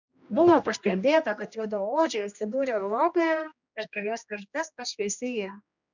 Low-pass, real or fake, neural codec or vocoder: 7.2 kHz; fake; codec, 16 kHz, 1 kbps, X-Codec, HuBERT features, trained on general audio